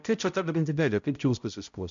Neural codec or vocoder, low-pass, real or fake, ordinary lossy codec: codec, 16 kHz, 0.5 kbps, X-Codec, HuBERT features, trained on balanced general audio; 7.2 kHz; fake; MP3, 64 kbps